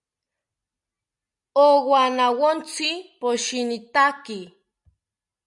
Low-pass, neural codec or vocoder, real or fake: 10.8 kHz; none; real